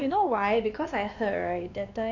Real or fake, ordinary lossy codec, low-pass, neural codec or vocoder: fake; none; 7.2 kHz; codec, 16 kHz, 2 kbps, X-Codec, WavLM features, trained on Multilingual LibriSpeech